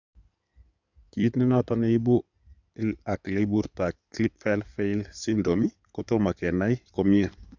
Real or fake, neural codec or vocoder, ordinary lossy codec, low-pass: fake; codec, 16 kHz in and 24 kHz out, 2.2 kbps, FireRedTTS-2 codec; none; 7.2 kHz